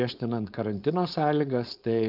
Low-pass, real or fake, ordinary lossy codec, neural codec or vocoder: 5.4 kHz; fake; Opus, 24 kbps; codec, 16 kHz, 4.8 kbps, FACodec